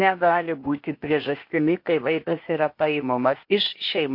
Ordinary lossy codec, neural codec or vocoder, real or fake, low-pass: MP3, 32 kbps; codec, 16 kHz, 0.8 kbps, ZipCodec; fake; 5.4 kHz